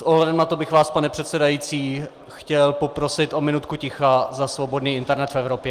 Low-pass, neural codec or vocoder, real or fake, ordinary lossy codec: 14.4 kHz; none; real; Opus, 24 kbps